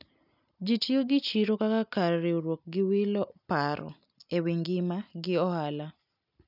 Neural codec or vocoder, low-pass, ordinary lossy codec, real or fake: none; 5.4 kHz; none; real